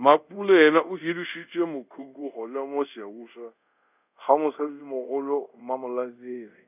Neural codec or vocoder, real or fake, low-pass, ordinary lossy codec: codec, 24 kHz, 0.5 kbps, DualCodec; fake; 3.6 kHz; none